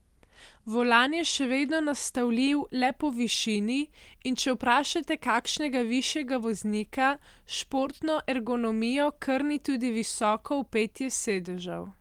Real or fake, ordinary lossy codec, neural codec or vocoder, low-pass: real; Opus, 24 kbps; none; 19.8 kHz